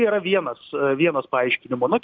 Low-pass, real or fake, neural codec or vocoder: 7.2 kHz; real; none